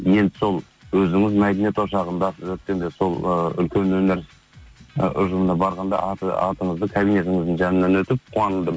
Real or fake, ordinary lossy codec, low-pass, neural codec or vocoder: real; none; none; none